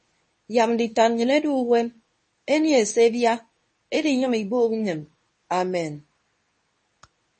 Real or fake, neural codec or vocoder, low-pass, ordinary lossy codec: fake; codec, 24 kHz, 0.9 kbps, WavTokenizer, medium speech release version 2; 10.8 kHz; MP3, 32 kbps